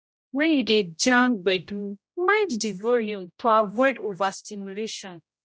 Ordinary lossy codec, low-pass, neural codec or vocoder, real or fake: none; none; codec, 16 kHz, 0.5 kbps, X-Codec, HuBERT features, trained on general audio; fake